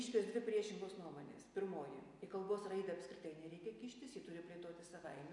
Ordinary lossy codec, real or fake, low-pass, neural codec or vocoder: AAC, 64 kbps; real; 10.8 kHz; none